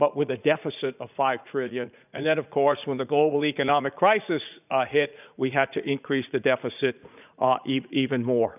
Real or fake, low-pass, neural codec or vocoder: fake; 3.6 kHz; vocoder, 44.1 kHz, 80 mel bands, Vocos